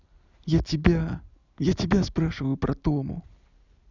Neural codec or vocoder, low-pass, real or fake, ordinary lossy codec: none; 7.2 kHz; real; none